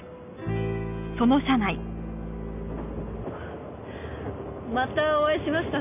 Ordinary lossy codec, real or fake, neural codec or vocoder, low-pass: none; real; none; 3.6 kHz